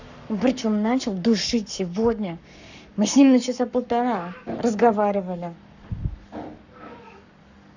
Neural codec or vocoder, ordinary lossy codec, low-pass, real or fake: codec, 44.1 kHz, 7.8 kbps, Pupu-Codec; none; 7.2 kHz; fake